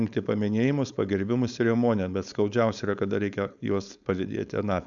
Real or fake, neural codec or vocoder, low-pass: fake; codec, 16 kHz, 4.8 kbps, FACodec; 7.2 kHz